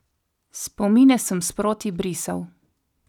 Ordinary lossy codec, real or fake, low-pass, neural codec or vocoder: none; real; 19.8 kHz; none